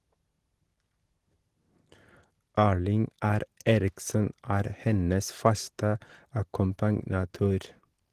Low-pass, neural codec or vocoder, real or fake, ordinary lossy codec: 14.4 kHz; none; real; Opus, 16 kbps